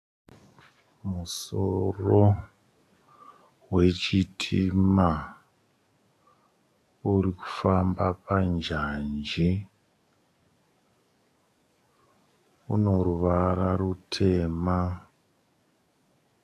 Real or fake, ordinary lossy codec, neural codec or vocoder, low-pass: fake; AAC, 64 kbps; codec, 44.1 kHz, 7.8 kbps, DAC; 14.4 kHz